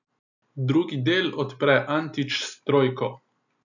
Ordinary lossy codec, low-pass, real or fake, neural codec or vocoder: none; 7.2 kHz; real; none